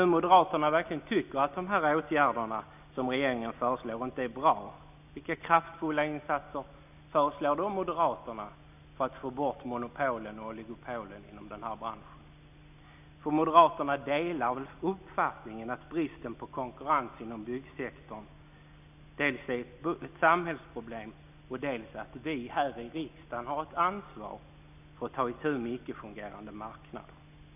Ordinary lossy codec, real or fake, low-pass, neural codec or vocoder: none; real; 3.6 kHz; none